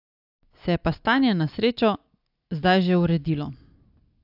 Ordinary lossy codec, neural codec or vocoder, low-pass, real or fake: AAC, 48 kbps; none; 5.4 kHz; real